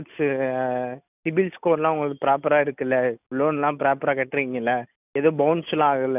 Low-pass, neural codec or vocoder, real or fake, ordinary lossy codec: 3.6 kHz; none; real; none